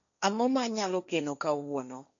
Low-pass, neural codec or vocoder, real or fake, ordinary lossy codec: 7.2 kHz; codec, 16 kHz, 1.1 kbps, Voila-Tokenizer; fake; none